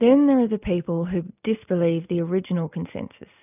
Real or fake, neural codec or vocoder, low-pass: real; none; 3.6 kHz